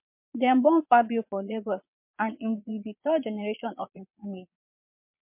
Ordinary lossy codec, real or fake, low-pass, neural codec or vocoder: MP3, 32 kbps; fake; 3.6 kHz; vocoder, 22.05 kHz, 80 mel bands, Vocos